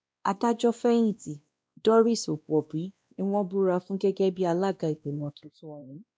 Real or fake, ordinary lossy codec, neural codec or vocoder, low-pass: fake; none; codec, 16 kHz, 1 kbps, X-Codec, WavLM features, trained on Multilingual LibriSpeech; none